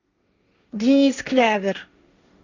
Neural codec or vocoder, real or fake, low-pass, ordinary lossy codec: codec, 16 kHz, 1.1 kbps, Voila-Tokenizer; fake; 7.2 kHz; Opus, 64 kbps